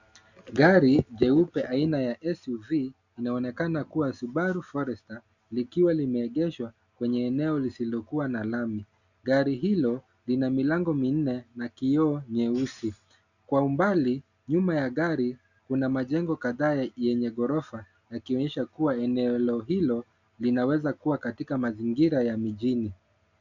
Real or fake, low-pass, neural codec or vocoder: real; 7.2 kHz; none